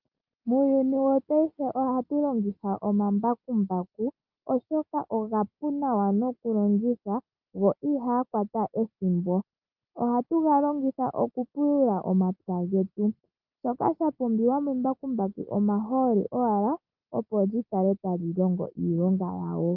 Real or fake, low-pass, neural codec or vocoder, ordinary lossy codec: real; 5.4 kHz; none; Opus, 32 kbps